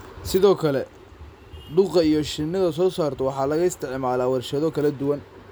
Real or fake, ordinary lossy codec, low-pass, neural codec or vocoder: real; none; none; none